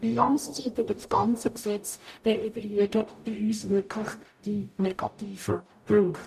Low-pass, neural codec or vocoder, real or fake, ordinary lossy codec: 14.4 kHz; codec, 44.1 kHz, 0.9 kbps, DAC; fake; none